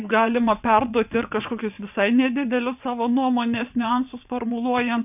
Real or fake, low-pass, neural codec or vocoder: fake; 3.6 kHz; vocoder, 22.05 kHz, 80 mel bands, WaveNeXt